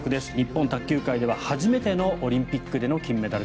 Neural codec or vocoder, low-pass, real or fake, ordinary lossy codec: none; none; real; none